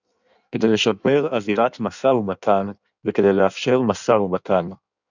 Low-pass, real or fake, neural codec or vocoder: 7.2 kHz; fake; codec, 16 kHz in and 24 kHz out, 1.1 kbps, FireRedTTS-2 codec